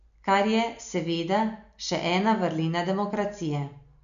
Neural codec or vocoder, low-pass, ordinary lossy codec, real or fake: none; 7.2 kHz; none; real